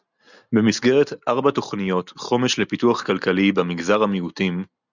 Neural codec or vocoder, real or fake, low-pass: none; real; 7.2 kHz